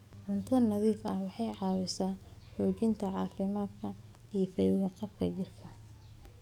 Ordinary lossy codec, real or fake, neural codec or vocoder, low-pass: none; fake; codec, 44.1 kHz, 7.8 kbps, DAC; 19.8 kHz